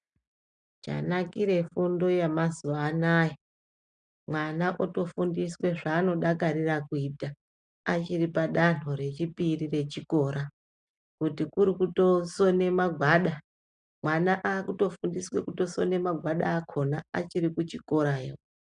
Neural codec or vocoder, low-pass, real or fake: none; 9.9 kHz; real